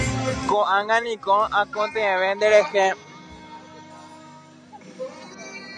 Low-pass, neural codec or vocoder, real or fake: 9.9 kHz; none; real